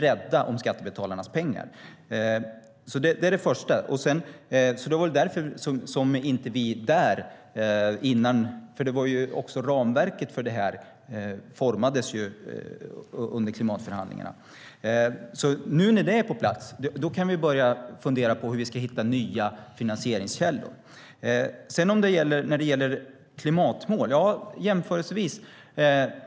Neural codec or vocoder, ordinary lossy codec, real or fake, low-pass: none; none; real; none